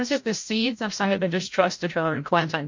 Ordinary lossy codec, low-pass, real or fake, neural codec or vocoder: MP3, 48 kbps; 7.2 kHz; fake; codec, 16 kHz, 0.5 kbps, FreqCodec, larger model